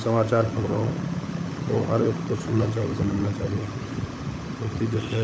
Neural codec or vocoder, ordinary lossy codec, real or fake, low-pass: codec, 16 kHz, 16 kbps, FunCodec, trained on LibriTTS, 50 frames a second; none; fake; none